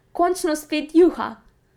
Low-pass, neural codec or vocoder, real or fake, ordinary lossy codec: 19.8 kHz; vocoder, 44.1 kHz, 128 mel bands every 512 samples, BigVGAN v2; fake; none